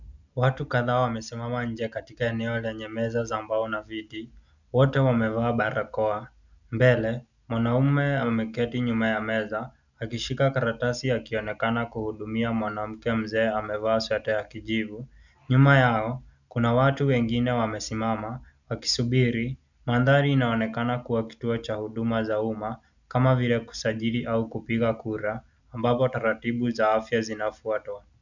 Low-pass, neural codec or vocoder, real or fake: 7.2 kHz; none; real